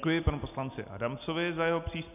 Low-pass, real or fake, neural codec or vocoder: 3.6 kHz; real; none